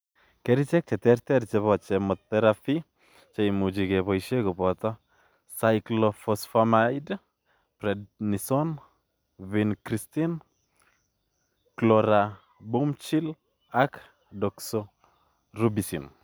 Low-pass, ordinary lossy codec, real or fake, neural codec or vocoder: none; none; real; none